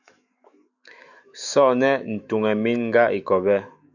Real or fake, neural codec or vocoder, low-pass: fake; autoencoder, 48 kHz, 128 numbers a frame, DAC-VAE, trained on Japanese speech; 7.2 kHz